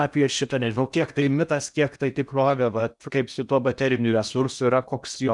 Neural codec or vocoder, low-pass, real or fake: codec, 16 kHz in and 24 kHz out, 0.8 kbps, FocalCodec, streaming, 65536 codes; 10.8 kHz; fake